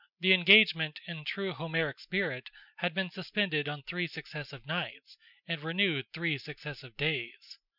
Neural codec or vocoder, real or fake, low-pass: none; real; 5.4 kHz